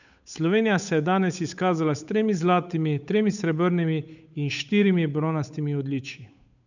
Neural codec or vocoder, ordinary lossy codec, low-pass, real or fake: codec, 16 kHz, 8 kbps, FunCodec, trained on Chinese and English, 25 frames a second; none; 7.2 kHz; fake